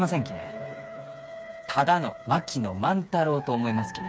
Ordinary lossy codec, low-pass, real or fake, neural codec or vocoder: none; none; fake; codec, 16 kHz, 4 kbps, FreqCodec, smaller model